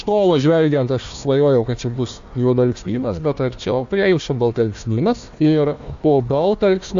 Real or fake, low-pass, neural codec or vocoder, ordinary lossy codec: fake; 7.2 kHz; codec, 16 kHz, 1 kbps, FunCodec, trained on Chinese and English, 50 frames a second; AAC, 64 kbps